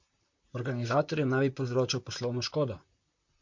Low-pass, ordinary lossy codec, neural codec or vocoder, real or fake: 7.2 kHz; MP3, 48 kbps; vocoder, 44.1 kHz, 128 mel bands, Pupu-Vocoder; fake